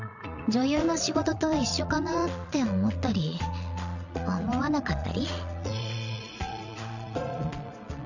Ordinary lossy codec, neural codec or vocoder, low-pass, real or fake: none; vocoder, 22.05 kHz, 80 mel bands, Vocos; 7.2 kHz; fake